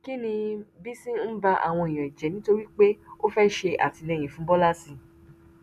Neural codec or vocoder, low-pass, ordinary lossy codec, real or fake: none; 14.4 kHz; none; real